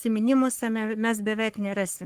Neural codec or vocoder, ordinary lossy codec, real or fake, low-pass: codec, 44.1 kHz, 3.4 kbps, Pupu-Codec; Opus, 24 kbps; fake; 14.4 kHz